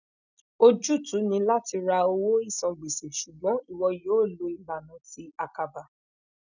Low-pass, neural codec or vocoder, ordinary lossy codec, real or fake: 7.2 kHz; none; none; real